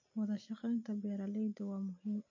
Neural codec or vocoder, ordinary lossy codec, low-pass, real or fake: none; MP3, 32 kbps; 7.2 kHz; real